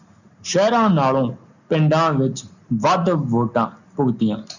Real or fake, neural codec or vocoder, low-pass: real; none; 7.2 kHz